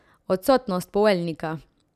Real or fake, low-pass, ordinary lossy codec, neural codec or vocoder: real; 14.4 kHz; none; none